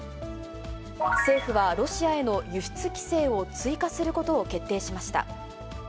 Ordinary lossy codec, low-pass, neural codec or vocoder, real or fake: none; none; none; real